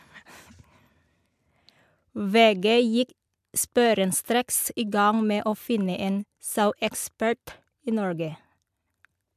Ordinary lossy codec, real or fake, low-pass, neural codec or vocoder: MP3, 96 kbps; real; 14.4 kHz; none